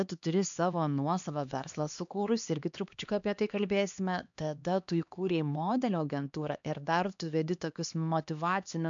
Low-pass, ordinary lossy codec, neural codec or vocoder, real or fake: 7.2 kHz; MP3, 64 kbps; codec, 16 kHz, 2 kbps, X-Codec, HuBERT features, trained on LibriSpeech; fake